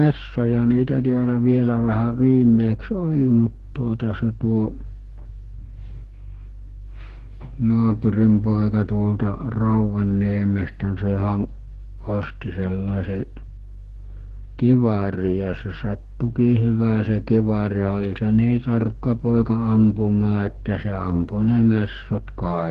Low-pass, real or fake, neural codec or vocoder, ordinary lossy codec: 14.4 kHz; fake; codec, 44.1 kHz, 2.6 kbps, DAC; Opus, 16 kbps